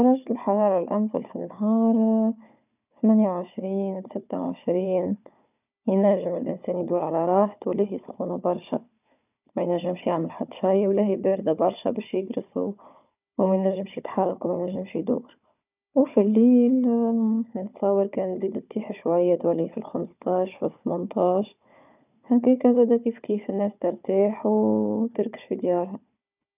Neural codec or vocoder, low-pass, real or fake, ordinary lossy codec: codec, 16 kHz, 4 kbps, FunCodec, trained on Chinese and English, 50 frames a second; 3.6 kHz; fake; none